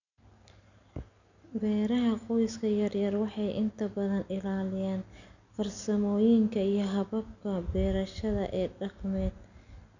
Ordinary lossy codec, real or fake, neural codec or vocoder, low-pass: none; real; none; 7.2 kHz